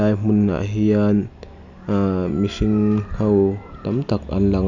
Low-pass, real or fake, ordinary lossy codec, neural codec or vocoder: 7.2 kHz; real; none; none